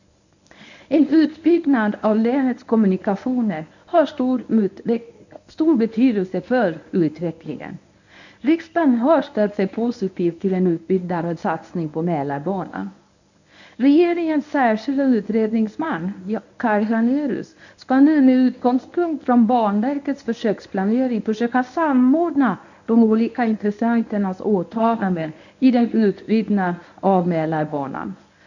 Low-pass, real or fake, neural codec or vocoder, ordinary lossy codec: 7.2 kHz; fake; codec, 24 kHz, 0.9 kbps, WavTokenizer, medium speech release version 1; none